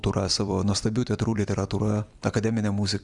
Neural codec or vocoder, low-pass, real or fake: vocoder, 44.1 kHz, 128 mel bands every 256 samples, BigVGAN v2; 10.8 kHz; fake